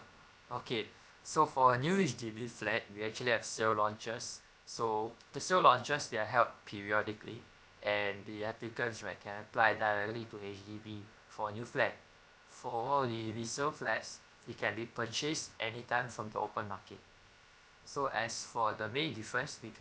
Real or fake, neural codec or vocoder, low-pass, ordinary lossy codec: fake; codec, 16 kHz, about 1 kbps, DyCAST, with the encoder's durations; none; none